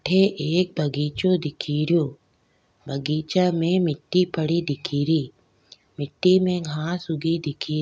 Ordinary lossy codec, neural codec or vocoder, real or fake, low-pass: none; none; real; none